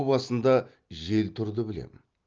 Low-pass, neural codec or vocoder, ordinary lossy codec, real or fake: 7.2 kHz; none; Opus, 24 kbps; real